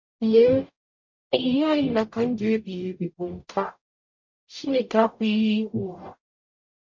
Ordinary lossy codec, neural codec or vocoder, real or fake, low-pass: MP3, 48 kbps; codec, 44.1 kHz, 0.9 kbps, DAC; fake; 7.2 kHz